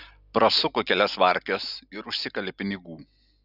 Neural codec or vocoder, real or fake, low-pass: none; real; 5.4 kHz